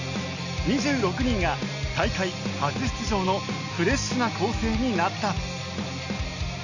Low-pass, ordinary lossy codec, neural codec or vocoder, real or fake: 7.2 kHz; none; none; real